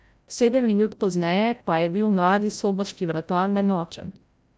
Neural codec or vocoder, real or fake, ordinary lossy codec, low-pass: codec, 16 kHz, 0.5 kbps, FreqCodec, larger model; fake; none; none